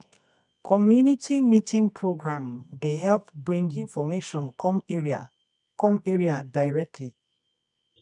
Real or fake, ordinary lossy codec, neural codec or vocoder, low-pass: fake; none; codec, 24 kHz, 0.9 kbps, WavTokenizer, medium music audio release; 10.8 kHz